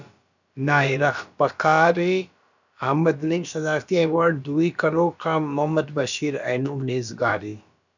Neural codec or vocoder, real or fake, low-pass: codec, 16 kHz, about 1 kbps, DyCAST, with the encoder's durations; fake; 7.2 kHz